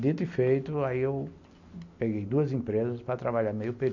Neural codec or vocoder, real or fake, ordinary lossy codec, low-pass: none; real; none; 7.2 kHz